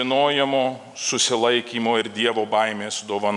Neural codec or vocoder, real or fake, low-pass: none; real; 10.8 kHz